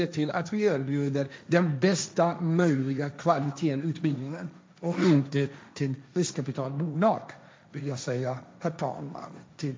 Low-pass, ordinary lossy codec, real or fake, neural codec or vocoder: none; none; fake; codec, 16 kHz, 1.1 kbps, Voila-Tokenizer